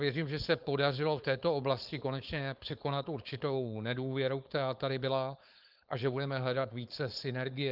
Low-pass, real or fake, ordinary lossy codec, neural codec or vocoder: 5.4 kHz; fake; Opus, 32 kbps; codec, 16 kHz, 4.8 kbps, FACodec